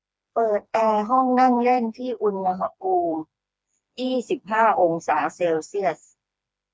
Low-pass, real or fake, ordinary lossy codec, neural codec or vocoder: none; fake; none; codec, 16 kHz, 2 kbps, FreqCodec, smaller model